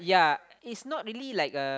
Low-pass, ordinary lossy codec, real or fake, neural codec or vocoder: none; none; real; none